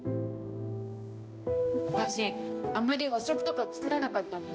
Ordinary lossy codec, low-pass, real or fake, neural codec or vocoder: none; none; fake; codec, 16 kHz, 1 kbps, X-Codec, HuBERT features, trained on balanced general audio